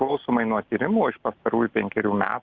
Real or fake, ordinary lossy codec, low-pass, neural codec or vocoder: real; Opus, 24 kbps; 7.2 kHz; none